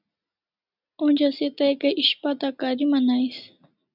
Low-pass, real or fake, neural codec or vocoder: 5.4 kHz; real; none